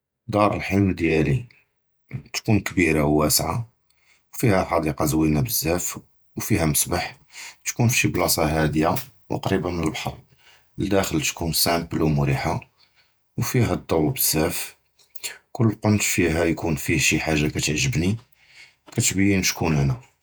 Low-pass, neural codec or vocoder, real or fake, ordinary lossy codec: none; vocoder, 48 kHz, 128 mel bands, Vocos; fake; none